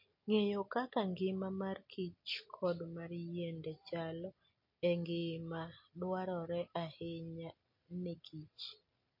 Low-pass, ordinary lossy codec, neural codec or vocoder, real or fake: 5.4 kHz; MP3, 32 kbps; none; real